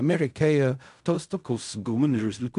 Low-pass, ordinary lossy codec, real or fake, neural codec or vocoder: 10.8 kHz; AAC, 96 kbps; fake; codec, 16 kHz in and 24 kHz out, 0.4 kbps, LongCat-Audio-Codec, fine tuned four codebook decoder